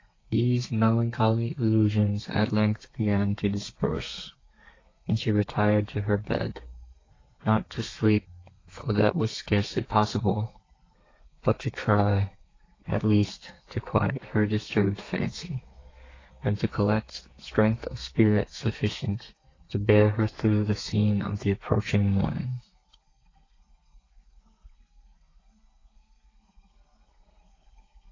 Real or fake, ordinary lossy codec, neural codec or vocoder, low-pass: fake; AAC, 32 kbps; codec, 32 kHz, 1.9 kbps, SNAC; 7.2 kHz